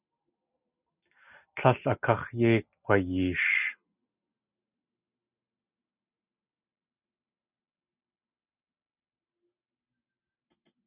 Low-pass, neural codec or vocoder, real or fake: 3.6 kHz; none; real